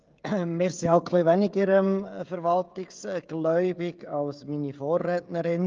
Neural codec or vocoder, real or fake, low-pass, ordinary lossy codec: codec, 16 kHz, 16 kbps, FreqCodec, smaller model; fake; 7.2 kHz; Opus, 24 kbps